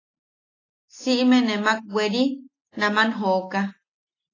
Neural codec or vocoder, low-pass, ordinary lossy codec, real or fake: none; 7.2 kHz; AAC, 32 kbps; real